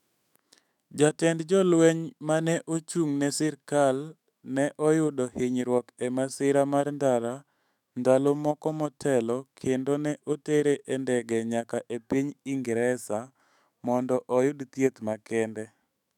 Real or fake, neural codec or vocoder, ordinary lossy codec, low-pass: fake; autoencoder, 48 kHz, 128 numbers a frame, DAC-VAE, trained on Japanese speech; none; 19.8 kHz